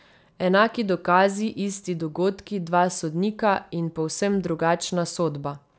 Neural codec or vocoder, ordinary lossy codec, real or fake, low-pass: none; none; real; none